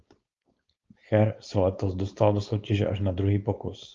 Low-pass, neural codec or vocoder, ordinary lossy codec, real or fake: 7.2 kHz; codec, 16 kHz, 4.8 kbps, FACodec; Opus, 32 kbps; fake